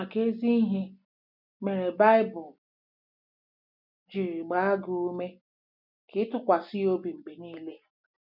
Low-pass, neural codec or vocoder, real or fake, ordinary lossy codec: 5.4 kHz; none; real; none